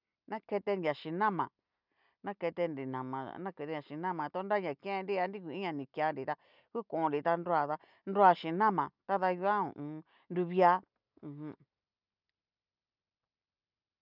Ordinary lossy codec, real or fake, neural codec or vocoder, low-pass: none; real; none; 5.4 kHz